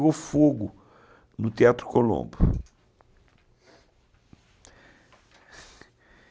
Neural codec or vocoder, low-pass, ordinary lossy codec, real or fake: none; none; none; real